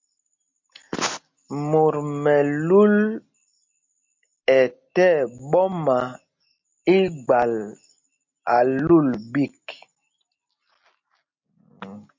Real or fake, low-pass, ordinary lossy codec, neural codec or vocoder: real; 7.2 kHz; MP3, 48 kbps; none